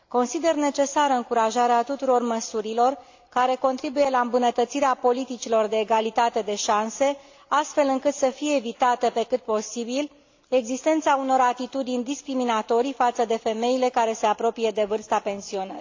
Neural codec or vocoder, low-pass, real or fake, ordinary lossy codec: none; 7.2 kHz; real; AAC, 48 kbps